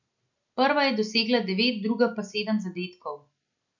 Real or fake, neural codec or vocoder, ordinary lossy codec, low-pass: real; none; none; 7.2 kHz